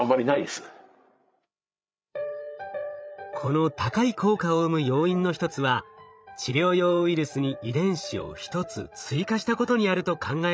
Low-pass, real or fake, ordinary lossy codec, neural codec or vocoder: none; fake; none; codec, 16 kHz, 16 kbps, FreqCodec, larger model